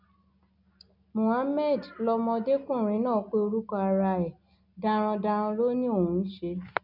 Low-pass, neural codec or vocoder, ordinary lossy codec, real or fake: 5.4 kHz; none; none; real